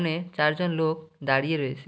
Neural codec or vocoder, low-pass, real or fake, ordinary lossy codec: none; none; real; none